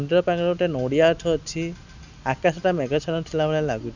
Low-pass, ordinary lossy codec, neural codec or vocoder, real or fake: 7.2 kHz; none; none; real